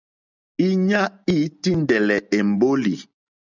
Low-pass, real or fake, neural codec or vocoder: 7.2 kHz; real; none